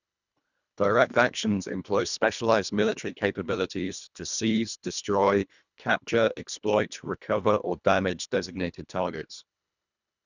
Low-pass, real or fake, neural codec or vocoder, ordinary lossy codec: 7.2 kHz; fake; codec, 24 kHz, 1.5 kbps, HILCodec; none